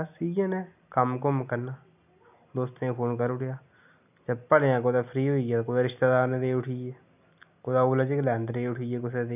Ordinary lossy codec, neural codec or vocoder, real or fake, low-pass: none; none; real; 3.6 kHz